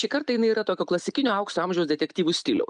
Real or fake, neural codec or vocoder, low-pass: real; none; 9.9 kHz